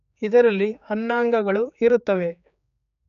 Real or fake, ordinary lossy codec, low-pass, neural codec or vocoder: fake; none; 7.2 kHz; codec, 16 kHz, 4 kbps, X-Codec, HuBERT features, trained on general audio